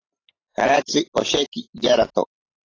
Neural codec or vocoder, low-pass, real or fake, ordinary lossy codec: vocoder, 44.1 kHz, 128 mel bands every 512 samples, BigVGAN v2; 7.2 kHz; fake; AAC, 32 kbps